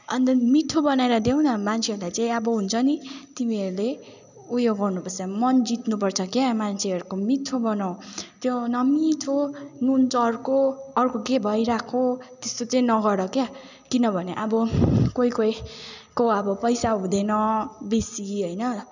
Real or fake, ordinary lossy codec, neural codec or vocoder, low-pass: real; none; none; 7.2 kHz